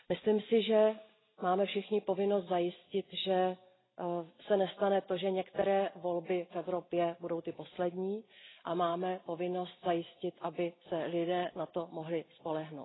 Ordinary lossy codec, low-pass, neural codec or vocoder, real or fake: AAC, 16 kbps; 7.2 kHz; none; real